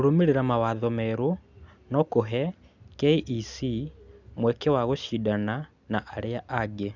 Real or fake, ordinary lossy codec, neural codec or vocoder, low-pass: real; none; none; 7.2 kHz